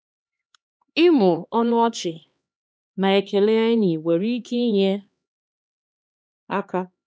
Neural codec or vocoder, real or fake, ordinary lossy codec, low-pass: codec, 16 kHz, 2 kbps, X-Codec, HuBERT features, trained on LibriSpeech; fake; none; none